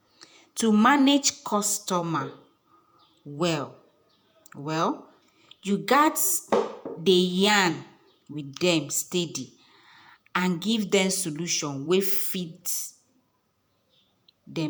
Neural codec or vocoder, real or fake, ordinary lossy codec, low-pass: vocoder, 48 kHz, 128 mel bands, Vocos; fake; none; none